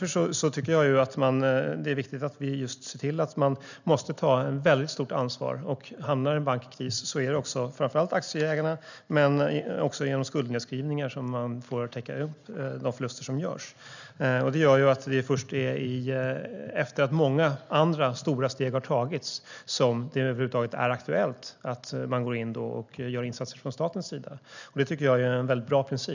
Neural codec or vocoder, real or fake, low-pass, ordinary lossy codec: none; real; 7.2 kHz; none